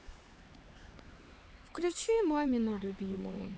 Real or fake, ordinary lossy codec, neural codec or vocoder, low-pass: fake; none; codec, 16 kHz, 4 kbps, X-Codec, HuBERT features, trained on LibriSpeech; none